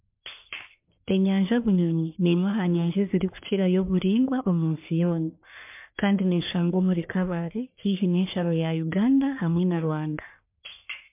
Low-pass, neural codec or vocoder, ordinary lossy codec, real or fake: 3.6 kHz; codec, 44.1 kHz, 1.7 kbps, Pupu-Codec; MP3, 32 kbps; fake